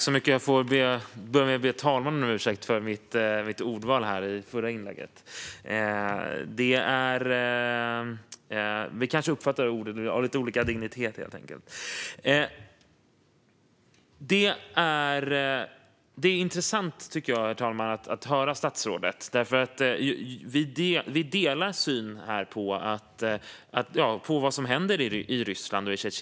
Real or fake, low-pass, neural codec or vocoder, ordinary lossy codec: real; none; none; none